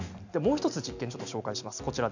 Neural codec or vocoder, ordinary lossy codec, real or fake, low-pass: none; none; real; 7.2 kHz